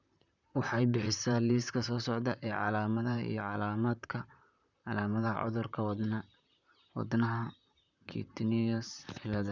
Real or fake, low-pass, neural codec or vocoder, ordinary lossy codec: fake; 7.2 kHz; vocoder, 44.1 kHz, 128 mel bands, Pupu-Vocoder; none